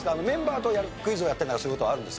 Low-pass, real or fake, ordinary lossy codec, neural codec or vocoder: none; real; none; none